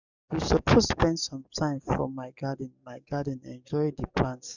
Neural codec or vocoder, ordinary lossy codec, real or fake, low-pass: codec, 44.1 kHz, 7.8 kbps, DAC; none; fake; 7.2 kHz